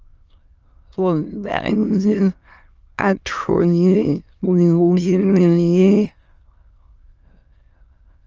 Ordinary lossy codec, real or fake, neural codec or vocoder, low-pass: Opus, 24 kbps; fake; autoencoder, 22.05 kHz, a latent of 192 numbers a frame, VITS, trained on many speakers; 7.2 kHz